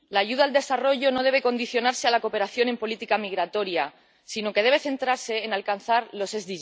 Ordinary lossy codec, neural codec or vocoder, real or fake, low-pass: none; none; real; none